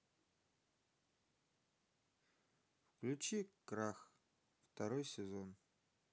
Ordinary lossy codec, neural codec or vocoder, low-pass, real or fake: none; none; none; real